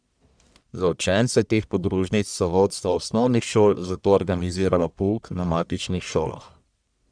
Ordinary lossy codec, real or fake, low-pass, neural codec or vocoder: none; fake; 9.9 kHz; codec, 44.1 kHz, 1.7 kbps, Pupu-Codec